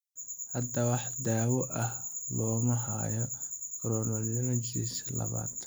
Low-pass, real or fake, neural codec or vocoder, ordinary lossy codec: none; real; none; none